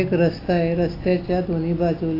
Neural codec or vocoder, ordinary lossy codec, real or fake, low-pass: none; AAC, 24 kbps; real; 5.4 kHz